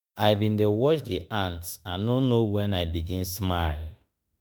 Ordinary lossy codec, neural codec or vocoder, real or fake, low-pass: none; autoencoder, 48 kHz, 32 numbers a frame, DAC-VAE, trained on Japanese speech; fake; none